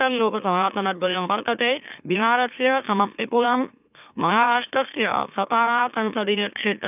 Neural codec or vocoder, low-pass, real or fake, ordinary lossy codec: autoencoder, 44.1 kHz, a latent of 192 numbers a frame, MeloTTS; 3.6 kHz; fake; none